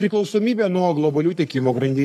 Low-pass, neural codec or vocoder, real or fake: 14.4 kHz; codec, 44.1 kHz, 3.4 kbps, Pupu-Codec; fake